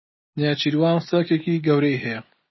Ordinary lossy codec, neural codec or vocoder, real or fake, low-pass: MP3, 24 kbps; none; real; 7.2 kHz